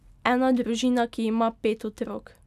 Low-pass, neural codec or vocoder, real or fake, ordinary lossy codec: 14.4 kHz; none; real; none